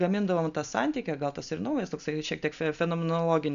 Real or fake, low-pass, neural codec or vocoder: real; 7.2 kHz; none